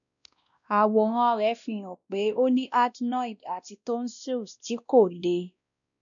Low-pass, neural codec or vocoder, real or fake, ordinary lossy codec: 7.2 kHz; codec, 16 kHz, 1 kbps, X-Codec, WavLM features, trained on Multilingual LibriSpeech; fake; none